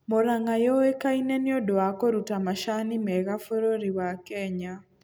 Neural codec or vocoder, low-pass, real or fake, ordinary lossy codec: none; none; real; none